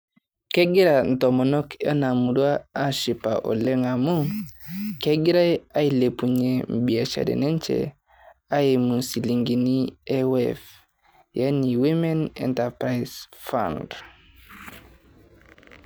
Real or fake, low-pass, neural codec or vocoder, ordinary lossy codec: real; none; none; none